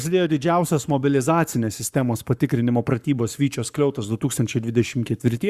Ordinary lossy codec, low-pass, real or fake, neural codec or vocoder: Opus, 64 kbps; 14.4 kHz; fake; codec, 44.1 kHz, 7.8 kbps, Pupu-Codec